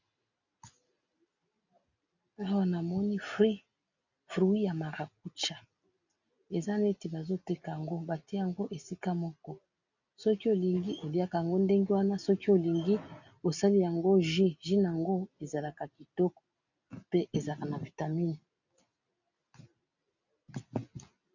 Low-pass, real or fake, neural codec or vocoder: 7.2 kHz; real; none